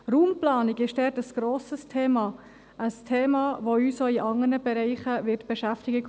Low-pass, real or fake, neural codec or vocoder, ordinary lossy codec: none; real; none; none